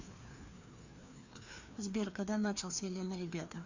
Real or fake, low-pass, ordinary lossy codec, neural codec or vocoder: fake; 7.2 kHz; Opus, 64 kbps; codec, 16 kHz, 2 kbps, FreqCodec, larger model